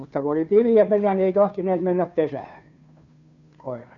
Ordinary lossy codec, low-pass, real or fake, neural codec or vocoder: none; 7.2 kHz; fake; codec, 16 kHz, 2 kbps, FunCodec, trained on Chinese and English, 25 frames a second